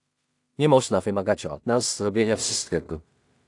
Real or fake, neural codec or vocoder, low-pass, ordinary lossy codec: fake; codec, 16 kHz in and 24 kHz out, 0.4 kbps, LongCat-Audio-Codec, two codebook decoder; 10.8 kHz; AAC, 64 kbps